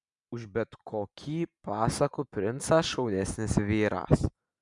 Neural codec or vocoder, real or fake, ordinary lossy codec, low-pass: none; real; MP3, 96 kbps; 10.8 kHz